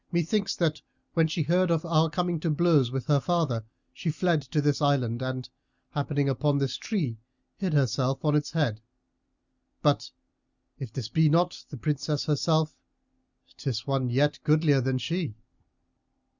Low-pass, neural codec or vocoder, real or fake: 7.2 kHz; none; real